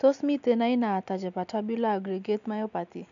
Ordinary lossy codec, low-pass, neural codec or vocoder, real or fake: none; 7.2 kHz; none; real